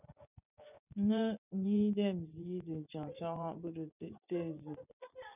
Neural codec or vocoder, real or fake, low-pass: vocoder, 24 kHz, 100 mel bands, Vocos; fake; 3.6 kHz